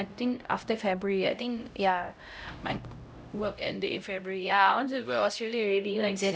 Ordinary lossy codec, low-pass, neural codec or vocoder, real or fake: none; none; codec, 16 kHz, 0.5 kbps, X-Codec, HuBERT features, trained on LibriSpeech; fake